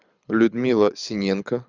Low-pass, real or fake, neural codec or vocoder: 7.2 kHz; fake; codec, 24 kHz, 6 kbps, HILCodec